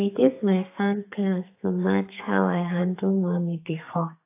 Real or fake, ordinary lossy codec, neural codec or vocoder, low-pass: fake; AAC, 24 kbps; codec, 32 kHz, 1.9 kbps, SNAC; 3.6 kHz